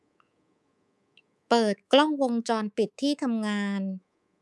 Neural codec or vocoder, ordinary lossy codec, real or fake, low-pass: codec, 24 kHz, 3.1 kbps, DualCodec; none; fake; none